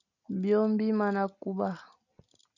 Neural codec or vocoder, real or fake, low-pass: none; real; 7.2 kHz